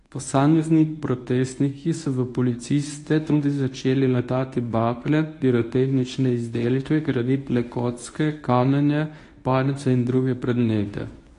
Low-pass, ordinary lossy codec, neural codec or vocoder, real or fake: 10.8 kHz; AAC, 48 kbps; codec, 24 kHz, 0.9 kbps, WavTokenizer, medium speech release version 1; fake